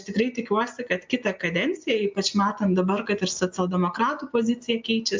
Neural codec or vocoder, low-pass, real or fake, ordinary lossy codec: none; 7.2 kHz; real; MP3, 64 kbps